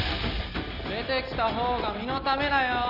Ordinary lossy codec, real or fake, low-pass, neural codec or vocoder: none; real; 5.4 kHz; none